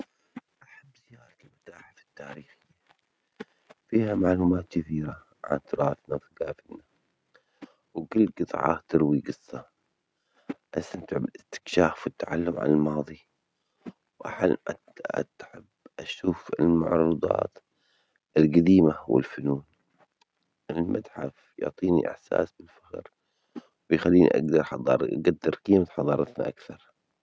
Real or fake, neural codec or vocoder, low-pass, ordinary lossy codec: real; none; none; none